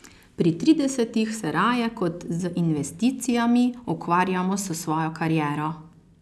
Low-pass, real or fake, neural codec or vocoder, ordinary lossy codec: none; real; none; none